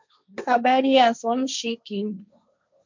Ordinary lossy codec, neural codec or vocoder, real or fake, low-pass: MP3, 64 kbps; codec, 16 kHz, 1.1 kbps, Voila-Tokenizer; fake; 7.2 kHz